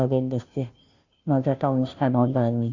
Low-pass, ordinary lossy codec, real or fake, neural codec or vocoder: 7.2 kHz; none; fake; codec, 16 kHz, 0.5 kbps, FunCodec, trained on Chinese and English, 25 frames a second